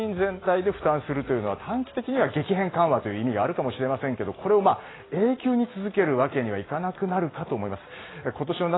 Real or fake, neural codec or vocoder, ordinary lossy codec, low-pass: real; none; AAC, 16 kbps; 7.2 kHz